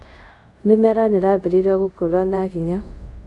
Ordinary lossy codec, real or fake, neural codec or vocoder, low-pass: none; fake; codec, 24 kHz, 0.5 kbps, DualCodec; 10.8 kHz